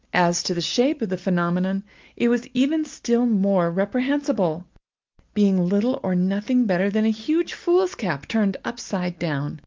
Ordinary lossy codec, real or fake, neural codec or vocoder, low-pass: Opus, 32 kbps; real; none; 7.2 kHz